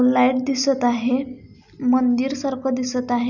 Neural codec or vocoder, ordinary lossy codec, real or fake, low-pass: none; none; real; 7.2 kHz